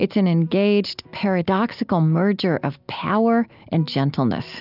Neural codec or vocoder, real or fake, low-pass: none; real; 5.4 kHz